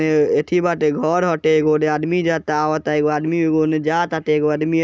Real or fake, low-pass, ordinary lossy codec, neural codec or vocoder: real; none; none; none